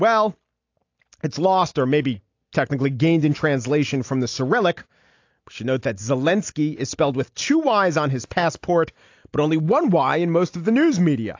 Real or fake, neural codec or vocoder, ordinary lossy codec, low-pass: real; none; AAC, 48 kbps; 7.2 kHz